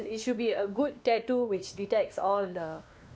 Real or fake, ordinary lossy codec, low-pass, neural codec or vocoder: fake; none; none; codec, 16 kHz, 2 kbps, X-Codec, WavLM features, trained on Multilingual LibriSpeech